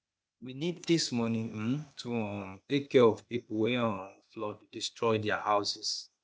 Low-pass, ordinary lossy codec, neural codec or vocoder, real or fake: none; none; codec, 16 kHz, 0.8 kbps, ZipCodec; fake